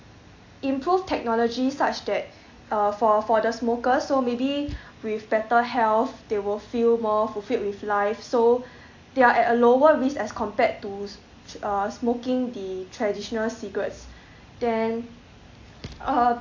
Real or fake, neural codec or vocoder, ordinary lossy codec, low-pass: real; none; AAC, 48 kbps; 7.2 kHz